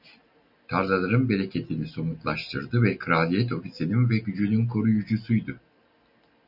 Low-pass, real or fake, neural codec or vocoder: 5.4 kHz; real; none